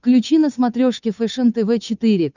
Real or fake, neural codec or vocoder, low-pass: fake; codec, 16 kHz, 8 kbps, FunCodec, trained on Chinese and English, 25 frames a second; 7.2 kHz